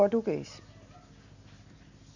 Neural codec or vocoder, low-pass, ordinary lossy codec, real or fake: none; 7.2 kHz; none; real